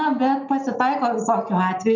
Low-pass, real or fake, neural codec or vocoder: 7.2 kHz; real; none